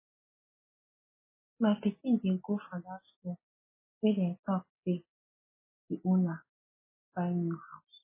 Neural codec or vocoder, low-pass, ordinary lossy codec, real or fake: none; 3.6 kHz; MP3, 16 kbps; real